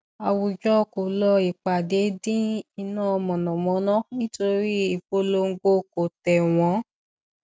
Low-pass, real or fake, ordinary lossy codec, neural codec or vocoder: none; real; none; none